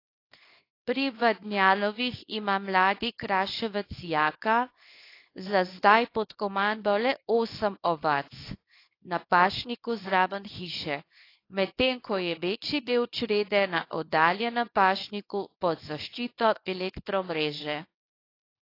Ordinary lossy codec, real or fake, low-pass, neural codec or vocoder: AAC, 32 kbps; fake; 5.4 kHz; codec, 24 kHz, 0.9 kbps, WavTokenizer, small release